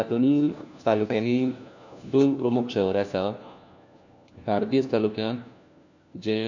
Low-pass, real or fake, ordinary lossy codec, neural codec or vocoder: 7.2 kHz; fake; none; codec, 16 kHz, 1 kbps, FunCodec, trained on LibriTTS, 50 frames a second